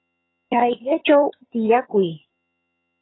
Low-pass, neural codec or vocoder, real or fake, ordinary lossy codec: 7.2 kHz; vocoder, 22.05 kHz, 80 mel bands, HiFi-GAN; fake; AAC, 16 kbps